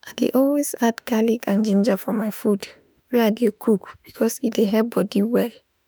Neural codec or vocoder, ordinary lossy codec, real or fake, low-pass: autoencoder, 48 kHz, 32 numbers a frame, DAC-VAE, trained on Japanese speech; none; fake; none